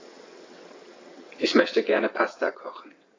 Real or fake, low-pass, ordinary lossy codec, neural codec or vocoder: fake; 7.2 kHz; AAC, 32 kbps; vocoder, 22.05 kHz, 80 mel bands, WaveNeXt